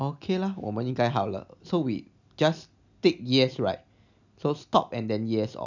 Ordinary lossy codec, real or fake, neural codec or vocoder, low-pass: none; real; none; 7.2 kHz